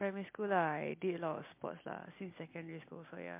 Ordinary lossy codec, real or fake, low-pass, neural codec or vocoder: MP3, 24 kbps; real; 3.6 kHz; none